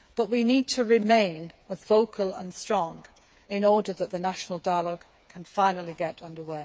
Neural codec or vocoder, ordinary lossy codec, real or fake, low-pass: codec, 16 kHz, 4 kbps, FreqCodec, smaller model; none; fake; none